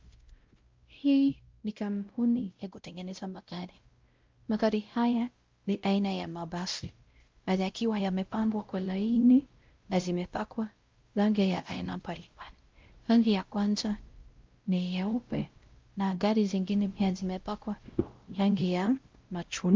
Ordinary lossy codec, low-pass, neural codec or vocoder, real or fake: Opus, 32 kbps; 7.2 kHz; codec, 16 kHz, 0.5 kbps, X-Codec, WavLM features, trained on Multilingual LibriSpeech; fake